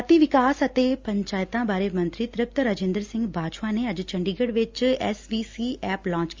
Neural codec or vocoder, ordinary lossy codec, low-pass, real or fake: none; Opus, 32 kbps; 7.2 kHz; real